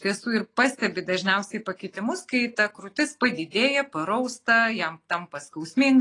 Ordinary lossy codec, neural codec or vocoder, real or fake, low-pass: AAC, 32 kbps; none; real; 10.8 kHz